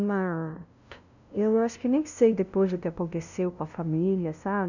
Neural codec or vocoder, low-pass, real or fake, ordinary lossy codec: codec, 16 kHz, 0.5 kbps, FunCodec, trained on LibriTTS, 25 frames a second; 7.2 kHz; fake; none